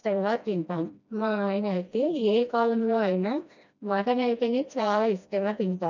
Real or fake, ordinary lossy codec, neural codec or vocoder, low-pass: fake; none; codec, 16 kHz, 1 kbps, FreqCodec, smaller model; 7.2 kHz